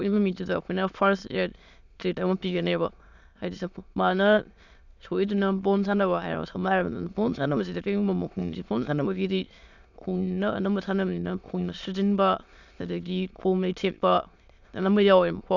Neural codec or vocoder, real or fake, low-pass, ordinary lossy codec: autoencoder, 22.05 kHz, a latent of 192 numbers a frame, VITS, trained on many speakers; fake; 7.2 kHz; none